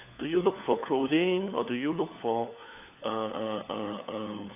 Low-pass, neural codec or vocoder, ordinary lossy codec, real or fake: 3.6 kHz; codec, 16 kHz, 4 kbps, FunCodec, trained on LibriTTS, 50 frames a second; AAC, 32 kbps; fake